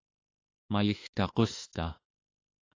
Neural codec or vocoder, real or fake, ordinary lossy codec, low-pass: autoencoder, 48 kHz, 32 numbers a frame, DAC-VAE, trained on Japanese speech; fake; AAC, 32 kbps; 7.2 kHz